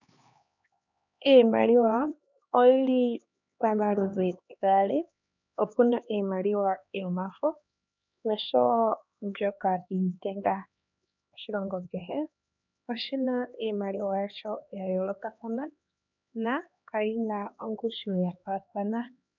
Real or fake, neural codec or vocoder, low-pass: fake; codec, 16 kHz, 2 kbps, X-Codec, HuBERT features, trained on LibriSpeech; 7.2 kHz